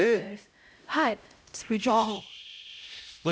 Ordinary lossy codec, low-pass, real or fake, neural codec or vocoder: none; none; fake; codec, 16 kHz, 0.5 kbps, X-Codec, HuBERT features, trained on LibriSpeech